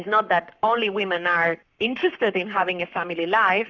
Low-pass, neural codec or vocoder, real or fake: 7.2 kHz; codec, 44.1 kHz, 7.8 kbps, Pupu-Codec; fake